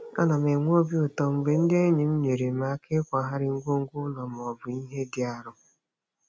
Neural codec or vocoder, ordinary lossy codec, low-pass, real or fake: none; none; none; real